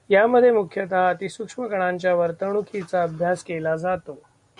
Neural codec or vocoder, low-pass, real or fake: none; 10.8 kHz; real